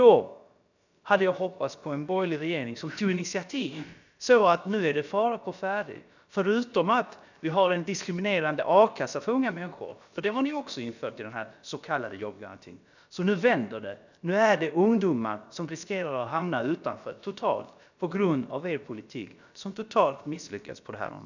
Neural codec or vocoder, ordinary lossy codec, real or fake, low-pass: codec, 16 kHz, about 1 kbps, DyCAST, with the encoder's durations; none; fake; 7.2 kHz